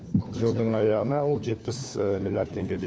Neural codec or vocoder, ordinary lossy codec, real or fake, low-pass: codec, 16 kHz, 4 kbps, FunCodec, trained on LibriTTS, 50 frames a second; none; fake; none